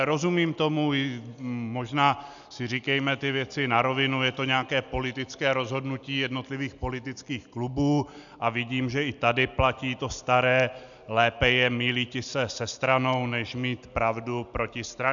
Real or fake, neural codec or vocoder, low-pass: real; none; 7.2 kHz